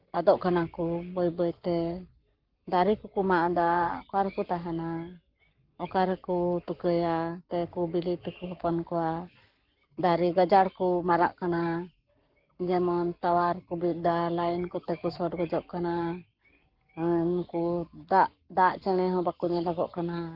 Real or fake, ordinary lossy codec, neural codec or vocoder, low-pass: fake; Opus, 32 kbps; codec, 44.1 kHz, 7.8 kbps, Pupu-Codec; 5.4 kHz